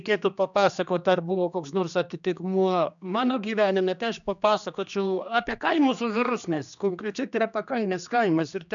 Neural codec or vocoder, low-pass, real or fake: codec, 16 kHz, 2 kbps, X-Codec, HuBERT features, trained on general audio; 7.2 kHz; fake